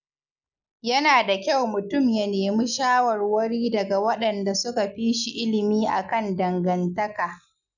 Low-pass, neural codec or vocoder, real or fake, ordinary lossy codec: 7.2 kHz; none; real; none